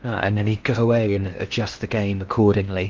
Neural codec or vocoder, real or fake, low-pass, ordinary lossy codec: codec, 16 kHz in and 24 kHz out, 0.8 kbps, FocalCodec, streaming, 65536 codes; fake; 7.2 kHz; Opus, 32 kbps